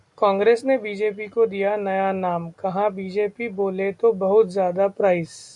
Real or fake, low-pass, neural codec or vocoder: real; 10.8 kHz; none